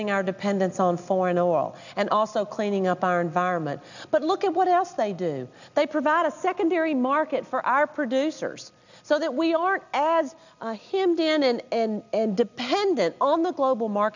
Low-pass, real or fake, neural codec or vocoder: 7.2 kHz; real; none